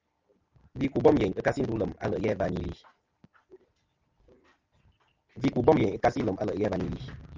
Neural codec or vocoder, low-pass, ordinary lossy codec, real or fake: none; 7.2 kHz; Opus, 24 kbps; real